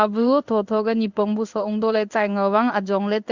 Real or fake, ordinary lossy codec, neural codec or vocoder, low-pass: fake; Opus, 64 kbps; codec, 16 kHz in and 24 kHz out, 1 kbps, XY-Tokenizer; 7.2 kHz